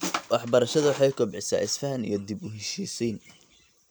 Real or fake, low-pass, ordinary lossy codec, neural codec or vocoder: real; none; none; none